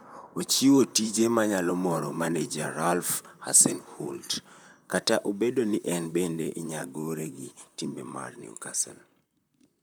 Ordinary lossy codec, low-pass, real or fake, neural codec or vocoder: none; none; fake; vocoder, 44.1 kHz, 128 mel bands, Pupu-Vocoder